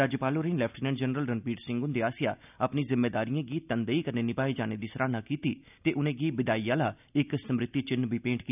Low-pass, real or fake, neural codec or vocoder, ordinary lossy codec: 3.6 kHz; real; none; none